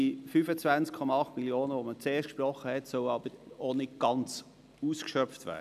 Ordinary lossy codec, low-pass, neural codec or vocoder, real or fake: none; 14.4 kHz; none; real